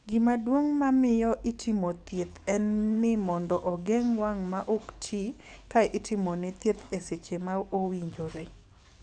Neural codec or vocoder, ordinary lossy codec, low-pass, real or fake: codec, 44.1 kHz, 7.8 kbps, DAC; none; 9.9 kHz; fake